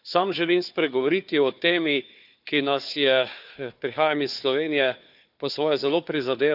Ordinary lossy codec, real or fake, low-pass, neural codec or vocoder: none; fake; 5.4 kHz; codec, 16 kHz, 4 kbps, FunCodec, trained on Chinese and English, 50 frames a second